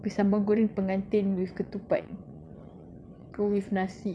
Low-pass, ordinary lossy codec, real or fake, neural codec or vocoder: none; none; fake; vocoder, 22.05 kHz, 80 mel bands, WaveNeXt